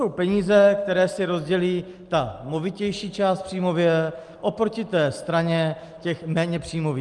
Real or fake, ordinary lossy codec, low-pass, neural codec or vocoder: real; Opus, 32 kbps; 10.8 kHz; none